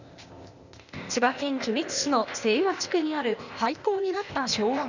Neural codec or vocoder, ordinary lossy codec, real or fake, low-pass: codec, 16 kHz, 0.8 kbps, ZipCodec; none; fake; 7.2 kHz